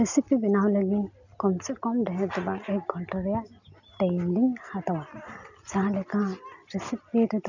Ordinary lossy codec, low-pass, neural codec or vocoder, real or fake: none; 7.2 kHz; none; real